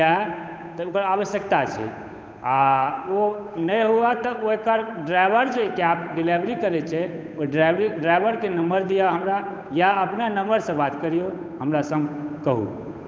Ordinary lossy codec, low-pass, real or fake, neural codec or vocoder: none; none; fake; codec, 16 kHz, 8 kbps, FunCodec, trained on Chinese and English, 25 frames a second